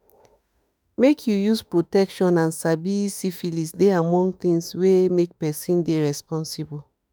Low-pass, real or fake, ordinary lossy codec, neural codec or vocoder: none; fake; none; autoencoder, 48 kHz, 32 numbers a frame, DAC-VAE, trained on Japanese speech